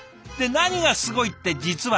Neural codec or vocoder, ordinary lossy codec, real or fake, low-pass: none; none; real; none